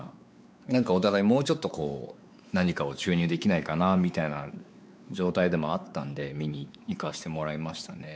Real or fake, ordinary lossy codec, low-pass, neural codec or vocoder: fake; none; none; codec, 16 kHz, 4 kbps, X-Codec, WavLM features, trained on Multilingual LibriSpeech